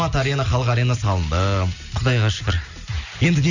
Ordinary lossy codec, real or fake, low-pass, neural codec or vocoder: none; real; 7.2 kHz; none